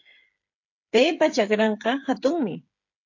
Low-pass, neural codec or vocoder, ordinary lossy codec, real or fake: 7.2 kHz; codec, 16 kHz, 8 kbps, FreqCodec, smaller model; AAC, 48 kbps; fake